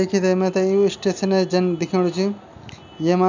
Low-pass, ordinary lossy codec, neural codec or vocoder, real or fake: 7.2 kHz; none; none; real